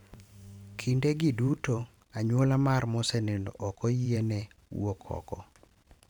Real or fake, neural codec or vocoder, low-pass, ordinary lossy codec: fake; vocoder, 44.1 kHz, 128 mel bands every 512 samples, BigVGAN v2; 19.8 kHz; none